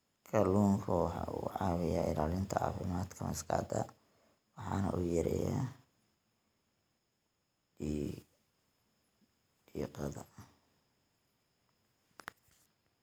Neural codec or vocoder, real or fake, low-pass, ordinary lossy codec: none; real; none; none